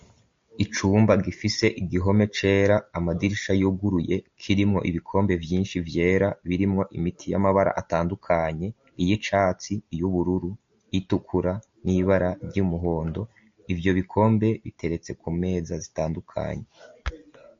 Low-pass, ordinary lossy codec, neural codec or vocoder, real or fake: 7.2 kHz; MP3, 48 kbps; none; real